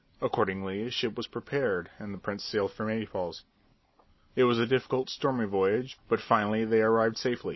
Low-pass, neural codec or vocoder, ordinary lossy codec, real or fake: 7.2 kHz; none; MP3, 24 kbps; real